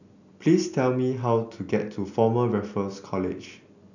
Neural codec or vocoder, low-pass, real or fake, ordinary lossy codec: none; 7.2 kHz; real; none